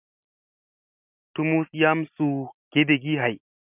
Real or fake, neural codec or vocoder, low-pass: real; none; 3.6 kHz